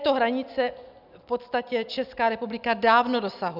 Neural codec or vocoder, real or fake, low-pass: none; real; 5.4 kHz